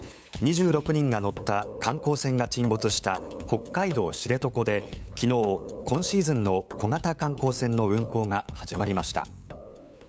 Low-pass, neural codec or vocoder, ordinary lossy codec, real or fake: none; codec, 16 kHz, 8 kbps, FunCodec, trained on LibriTTS, 25 frames a second; none; fake